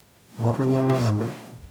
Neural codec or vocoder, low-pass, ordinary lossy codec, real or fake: codec, 44.1 kHz, 0.9 kbps, DAC; none; none; fake